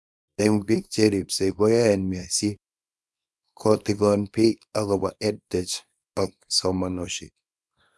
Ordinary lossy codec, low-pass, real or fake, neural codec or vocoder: none; none; fake; codec, 24 kHz, 0.9 kbps, WavTokenizer, small release